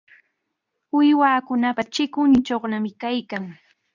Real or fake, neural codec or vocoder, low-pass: fake; codec, 24 kHz, 0.9 kbps, WavTokenizer, medium speech release version 2; 7.2 kHz